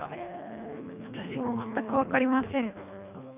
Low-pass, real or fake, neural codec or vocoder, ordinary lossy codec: 3.6 kHz; fake; codec, 24 kHz, 1.5 kbps, HILCodec; none